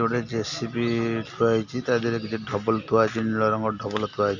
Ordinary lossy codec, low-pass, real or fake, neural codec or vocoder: none; 7.2 kHz; real; none